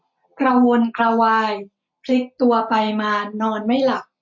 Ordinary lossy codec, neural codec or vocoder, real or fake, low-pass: MP3, 48 kbps; none; real; 7.2 kHz